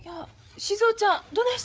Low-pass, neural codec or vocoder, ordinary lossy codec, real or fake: none; codec, 16 kHz, 8 kbps, FreqCodec, larger model; none; fake